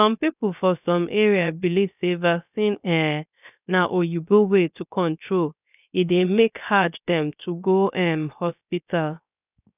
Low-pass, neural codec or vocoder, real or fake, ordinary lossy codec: 3.6 kHz; codec, 16 kHz, 0.7 kbps, FocalCodec; fake; none